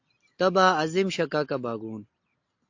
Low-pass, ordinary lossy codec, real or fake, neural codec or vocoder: 7.2 kHz; AAC, 48 kbps; real; none